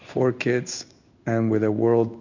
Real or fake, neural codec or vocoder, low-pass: fake; codec, 16 kHz in and 24 kHz out, 1 kbps, XY-Tokenizer; 7.2 kHz